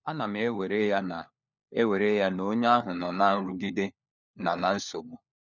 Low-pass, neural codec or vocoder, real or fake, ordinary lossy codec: 7.2 kHz; codec, 16 kHz, 4 kbps, FunCodec, trained on LibriTTS, 50 frames a second; fake; none